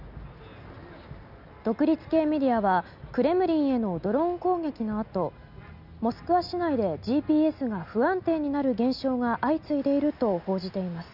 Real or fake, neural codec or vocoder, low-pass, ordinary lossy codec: real; none; 5.4 kHz; none